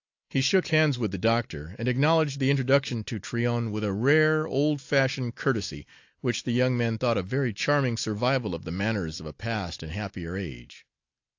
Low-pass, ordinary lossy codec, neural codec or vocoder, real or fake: 7.2 kHz; AAC, 48 kbps; none; real